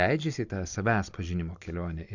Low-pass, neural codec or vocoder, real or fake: 7.2 kHz; none; real